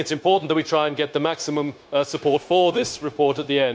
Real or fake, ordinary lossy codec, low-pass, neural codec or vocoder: fake; none; none; codec, 16 kHz, 0.9 kbps, LongCat-Audio-Codec